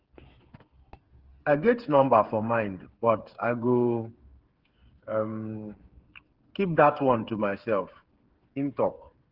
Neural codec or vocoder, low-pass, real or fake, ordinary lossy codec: codec, 16 kHz, 8 kbps, FreqCodec, smaller model; 5.4 kHz; fake; Opus, 16 kbps